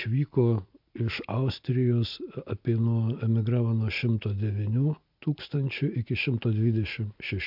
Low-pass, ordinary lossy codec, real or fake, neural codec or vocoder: 5.4 kHz; MP3, 48 kbps; real; none